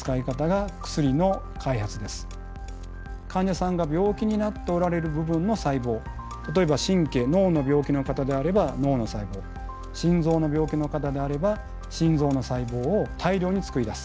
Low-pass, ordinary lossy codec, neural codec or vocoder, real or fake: none; none; none; real